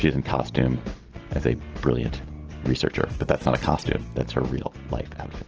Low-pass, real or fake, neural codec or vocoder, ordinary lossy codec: 7.2 kHz; real; none; Opus, 16 kbps